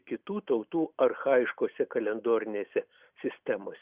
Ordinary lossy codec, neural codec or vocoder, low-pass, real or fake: Opus, 64 kbps; none; 3.6 kHz; real